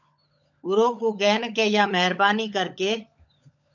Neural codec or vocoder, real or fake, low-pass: codec, 16 kHz, 16 kbps, FunCodec, trained on LibriTTS, 50 frames a second; fake; 7.2 kHz